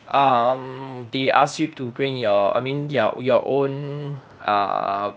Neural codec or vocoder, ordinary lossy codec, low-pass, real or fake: codec, 16 kHz, 0.8 kbps, ZipCodec; none; none; fake